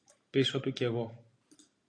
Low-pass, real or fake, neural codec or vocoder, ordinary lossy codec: 9.9 kHz; real; none; AAC, 48 kbps